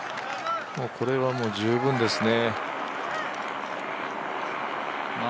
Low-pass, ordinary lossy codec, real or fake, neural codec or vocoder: none; none; real; none